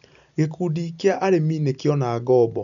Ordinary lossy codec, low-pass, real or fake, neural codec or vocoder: none; 7.2 kHz; real; none